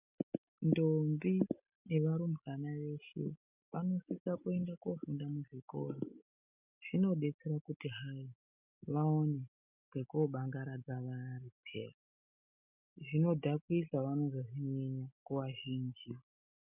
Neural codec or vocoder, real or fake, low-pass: none; real; 3.6 kHz